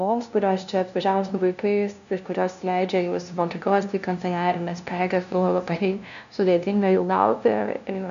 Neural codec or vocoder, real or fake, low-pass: codec, 16 kHz, 0.5 kbps, FunCodec, trained on LibriTTS, 25 frames a second; fake; 7.2 kHz